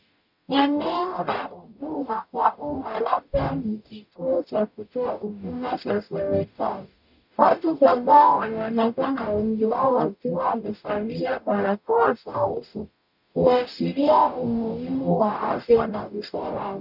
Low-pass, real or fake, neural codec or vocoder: 5.4 kHz; fake; codec, 44.1 kHz, 0.9 kbps, DAC